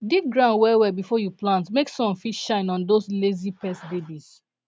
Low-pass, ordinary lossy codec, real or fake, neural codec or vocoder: none; none; real; none